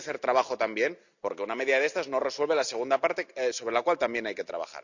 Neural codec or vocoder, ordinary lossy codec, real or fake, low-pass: none; none; real; 7.2 kHz